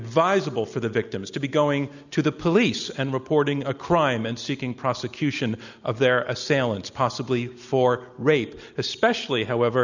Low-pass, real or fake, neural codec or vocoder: 7.2 kHz; real; none